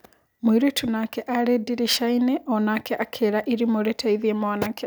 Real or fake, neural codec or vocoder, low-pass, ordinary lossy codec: real; none; none; none